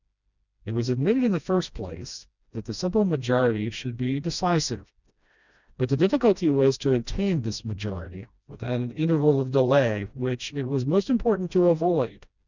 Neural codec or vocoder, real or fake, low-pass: codec, 16 kHz, 1 kbps, FreqCodec, smaller model; fake; 7.2 kHz